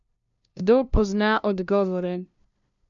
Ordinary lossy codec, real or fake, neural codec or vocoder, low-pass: none; fake; codec, 16 kHz, 0.5 kbps, FunCodec, trained on LibriTTS, 25 frames a second; 7.2 kHz